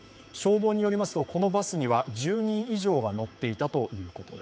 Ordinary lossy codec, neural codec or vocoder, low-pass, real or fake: none; codec, 16 kHz, 4 kbps, X-Codec, HuBERT features, trained on general audio; none; fake